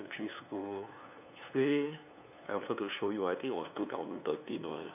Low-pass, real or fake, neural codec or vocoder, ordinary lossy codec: 3.6 kHz; fake; codec, 16 kHz, 2 kbps, FunCodec, trained on LibriTTS, 25 frames a second; none